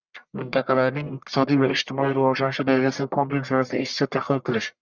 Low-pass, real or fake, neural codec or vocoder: 7.2 kHz; fake; codec, 44.1 kHz, 1.7 kbps, Pupu-Codec